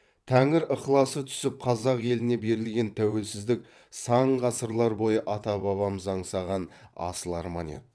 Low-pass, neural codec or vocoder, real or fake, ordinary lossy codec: none; vocoder, 22.05 kHz, 80 mel bands, WaveNeXt; fake; none